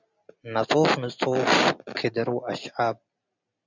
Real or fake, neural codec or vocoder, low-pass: real; none; 7.2 kHz